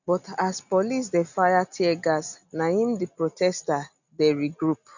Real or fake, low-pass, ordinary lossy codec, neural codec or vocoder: real; 7.2 kHz; none; none